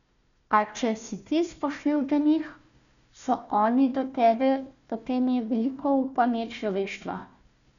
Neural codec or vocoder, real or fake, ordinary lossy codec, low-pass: codec, 16 kHz, 1 kbps, FunCodec, trained on Chinese and English, 50 frames a second; fake; none; 7.2 kHz